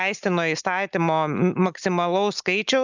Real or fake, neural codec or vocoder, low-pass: real; none; 7.2 kHz